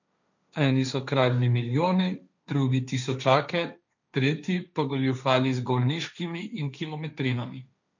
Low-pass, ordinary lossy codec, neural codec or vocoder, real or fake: 7.2 kHz; none; codec, 16 kHz, 1.1 kbps, Voila-Tokenizer; fake